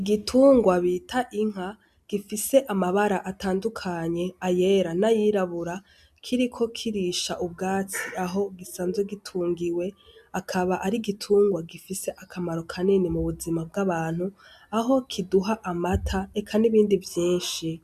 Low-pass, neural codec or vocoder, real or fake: 14.4 kHz; none; real